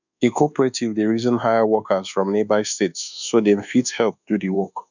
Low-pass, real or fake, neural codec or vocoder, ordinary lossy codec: 7.2 kHz; fake; codec, 24 kHz, 1.2 kbps, DualCodec; none